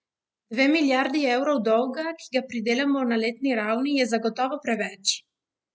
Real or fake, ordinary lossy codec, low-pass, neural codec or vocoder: real; none; none; none